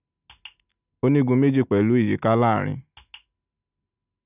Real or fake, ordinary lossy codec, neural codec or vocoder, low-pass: real; none; none; 3.6 kHz